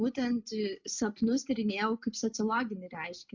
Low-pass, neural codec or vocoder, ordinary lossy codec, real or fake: 7.2 kHz; none; MP3, 64 kbps; real